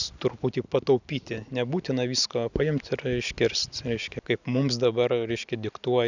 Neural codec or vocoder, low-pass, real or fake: none; 7.2 kHz; real